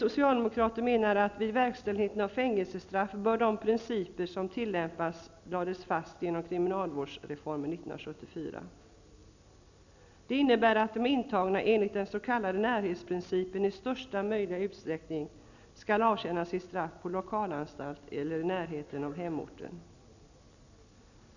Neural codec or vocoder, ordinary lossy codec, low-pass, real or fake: none; none; 7.2 kHz; real